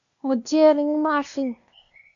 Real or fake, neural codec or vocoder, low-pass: fake; codec, 16 kHz, 0.8 kbps, ZipCodec; 7.2 kHz